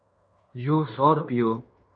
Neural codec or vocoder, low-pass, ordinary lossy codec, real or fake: codec, 16 kHz in and 24 kHz out, 0.9 kbps, LongCat-Audio-Codec, fine tuned four codebook decoder; 9.9 kHz; AAC, 64 kbps; fake